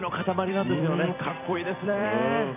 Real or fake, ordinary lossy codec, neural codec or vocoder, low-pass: fake; none; vocoder, 44.1 kHz, 128 mel bands every 256 samples, BigVGAN v2; 3.6 kHz